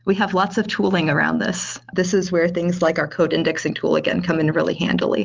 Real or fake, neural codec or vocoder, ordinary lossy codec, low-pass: real; none; Opus, 32 kbps; 7.2 kHz